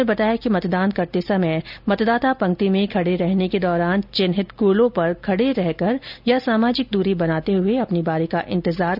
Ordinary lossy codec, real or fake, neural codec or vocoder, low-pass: none; real; none; 5.4 kHz